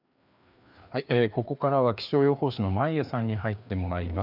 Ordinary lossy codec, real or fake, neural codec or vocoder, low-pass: none; fake; codec, 16 kHz, 2 kbps, FreqCodec, larger model; 5.4 kHz